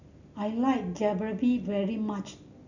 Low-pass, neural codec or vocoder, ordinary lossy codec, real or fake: 7.2 kHz; none; none; real